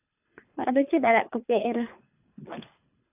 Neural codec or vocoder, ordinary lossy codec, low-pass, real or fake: codec, 24 kHz, 3 kbps, HILCodec; none; 3.6 kHz; fake